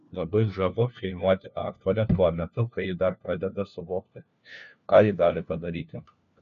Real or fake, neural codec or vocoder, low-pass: fake; codec, 16 kHz, 1 kbps, FunCodec, trained on LibriTTS, 50 frames a second; 7.2 kHz